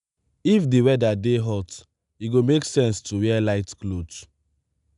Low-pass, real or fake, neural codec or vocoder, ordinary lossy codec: 10.8 kHz; real; none; none